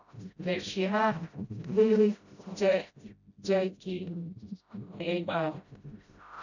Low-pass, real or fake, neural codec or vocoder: 7.2 kHz; fake; codec, 16 kHz, 0.5 kbps, FreqCodec, smaller model